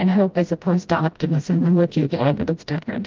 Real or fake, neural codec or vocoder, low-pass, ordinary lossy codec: fake; codec, 16 kHz, 0.5 kbps, FreqCodec, smaller model; 7.2 kHz; Opus, 24 kbps